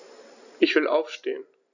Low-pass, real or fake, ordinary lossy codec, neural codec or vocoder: 7.2 kHz; real; none; none